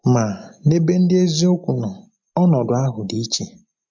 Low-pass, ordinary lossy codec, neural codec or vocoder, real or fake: 7.2 kHz; MP3, 48 kbps; none; real